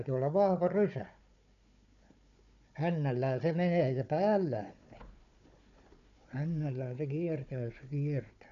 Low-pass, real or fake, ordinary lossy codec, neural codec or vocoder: 7.2 kHz; fake; none; codec, 16 kHz, 4 kbps, FunCodec, trained on Chinese and English, 50 frames a second